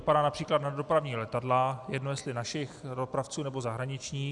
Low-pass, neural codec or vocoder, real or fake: 10.8 kHz; none; real